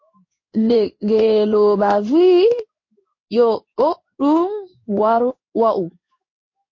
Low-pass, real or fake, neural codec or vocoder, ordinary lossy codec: 7.2 kHz; fake; codec, 16 kHz in and 24 kHz out, 1 kbps, XY-Tokenizer; MP3, 32 kbps